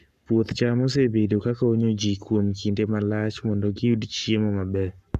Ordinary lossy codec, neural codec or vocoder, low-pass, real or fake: none; codec, 44.1 kHz, 7.8 kbps, Pupu-Codec; 14.4 kHz; fake